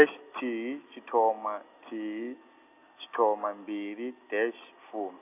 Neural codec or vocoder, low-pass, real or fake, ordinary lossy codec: none; 3.6 kHz; real; none